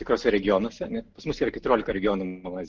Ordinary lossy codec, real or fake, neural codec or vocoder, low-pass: Opus, 16 kbps; real; none; 7.2 kHz